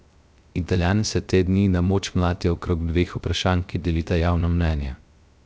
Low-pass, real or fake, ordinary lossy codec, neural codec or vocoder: none; fake; none; codec, 16 kHz, 0.3 kbps, FocalCodec